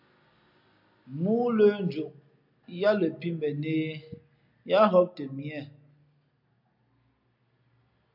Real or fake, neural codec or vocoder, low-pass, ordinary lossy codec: real; none; 5.4 kHz; MP3, 48 kbps